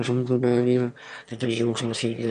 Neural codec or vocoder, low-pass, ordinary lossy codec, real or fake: autoencoder, 22.05 kHz, a latent of 192 numbers a frame, VITS, trained on one speaker; 9.9 kHz; MP3, 96 kbps; fake